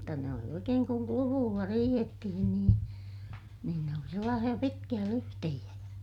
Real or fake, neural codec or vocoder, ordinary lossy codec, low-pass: real; none; none; 19.8 kHz